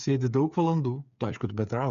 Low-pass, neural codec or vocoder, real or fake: 7.2 kHz; codec, 16 kHz, 8 kbps, FreqCodec, smaller model; fake